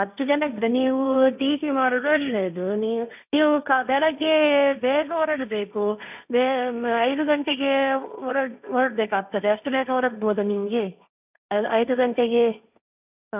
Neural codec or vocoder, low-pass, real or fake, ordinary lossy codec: codec, 16 kHz, 1.1 kbps, Voila-Tokenizer; 3.6 kHz; fake; none